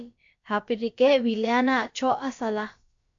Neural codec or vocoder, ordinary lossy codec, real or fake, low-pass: codec, 16 kHz, about 1 kbps, DyCAST, with the encoder's durations; MP3, 48 kbps; fake; 7.2 kHz